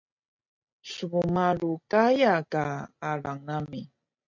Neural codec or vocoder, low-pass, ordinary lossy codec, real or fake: none; 7.2 kHz; MP3, 48 kbps; real